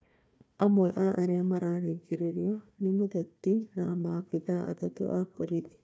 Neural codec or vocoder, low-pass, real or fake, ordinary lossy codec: codec, 16 kHz, 1 kbps, FunCodec, trained on Chinese and English, 50 frames a second; none; fake; none